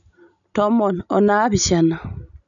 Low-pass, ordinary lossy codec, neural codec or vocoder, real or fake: 7.2 kHz; none; none; real